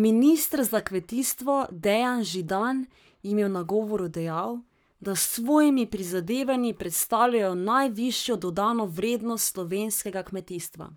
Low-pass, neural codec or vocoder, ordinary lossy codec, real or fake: none; codec, 44.1 kHz, 7.8 kbps, Pupu-Codec; none; fake